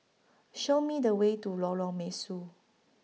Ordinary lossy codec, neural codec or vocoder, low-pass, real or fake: none; none; none; real